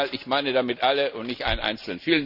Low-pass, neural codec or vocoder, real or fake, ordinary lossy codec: 5.4 kHz; none; real; none